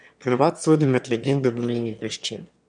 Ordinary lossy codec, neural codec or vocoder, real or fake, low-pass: none; autoencoder, 22.05 kHz, a latent of 192 numbers a frame, VITS, trained on one speaker; fake; 9.9 kHz